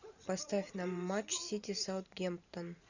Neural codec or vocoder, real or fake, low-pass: vocoder, 44.1 kHz, 128 mel bands every 512 samples, BigVGAN v2; fake; 7.2 kHz